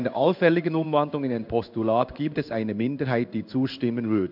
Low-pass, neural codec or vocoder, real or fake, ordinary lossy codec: 5.4 kHz; codec, 16 kHz in and 24 kHz out, 1 kbps, XY-Tokenizer; fake; none